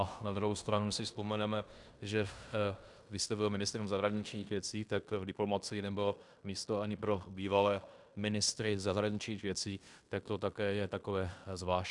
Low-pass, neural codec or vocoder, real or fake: 10.8 kHz; codec, 16 kHz in and 24 kHz out, 0.9 kbps, LongCat-Audio-Codec, fine tuned four codebook decoder; fake